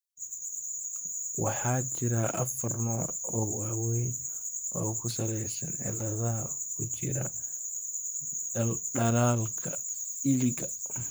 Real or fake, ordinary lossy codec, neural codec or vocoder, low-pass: fake; none; vocoder, 44.1 kHz, 128 mel bands, Pupu-Vocoder; none